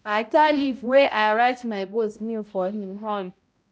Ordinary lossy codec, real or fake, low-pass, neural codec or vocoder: none; fake; none; codec, 16 kHz, 0.5 kbps, X-Codec, HuBERT features, trained on balanced general audio